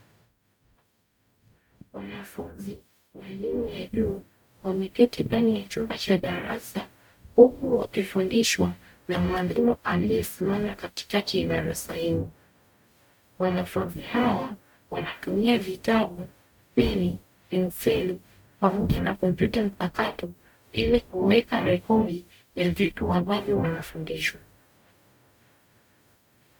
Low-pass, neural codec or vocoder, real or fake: 19.8 kHz; codec, 44.1 kHz, 0.9 kbps, DAC; fake